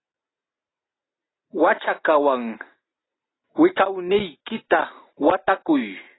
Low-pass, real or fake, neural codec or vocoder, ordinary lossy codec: 7.2 kHz; real; none; AAC, 16 kbps